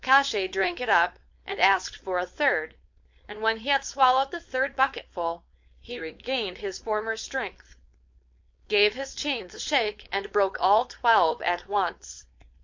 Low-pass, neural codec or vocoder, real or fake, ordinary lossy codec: 7.2 kHz; codec, 16 kHz, 4.8 kbps, FACodec; fake; MP3, 48 kbps